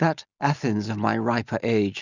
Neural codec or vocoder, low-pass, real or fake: none; 7.2 kHz; real